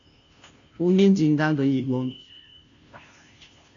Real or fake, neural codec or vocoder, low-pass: fake; codec, 16 kHz, 0.5 kbps, FunCodec, trained on Chinese and English, 25 frames a second; 7.2 kHz